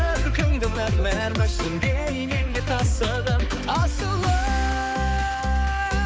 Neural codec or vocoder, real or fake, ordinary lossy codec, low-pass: codec, 16 kHz, 4 kbps, X-Codec, HuBERT features, trained on balanced general audio; fake; none; none